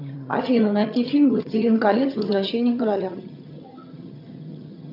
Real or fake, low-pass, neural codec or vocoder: fake; 5.4 kHz; vocoder, 22.05 kHz, 80 mel bands, HiFi-GAN